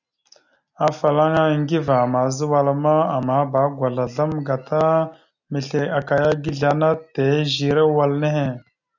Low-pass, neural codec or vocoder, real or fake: 7.2 kHz; none; real